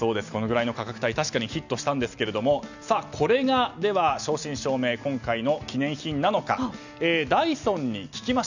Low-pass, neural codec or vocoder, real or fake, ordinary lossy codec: 7.2 kHz; none; real; none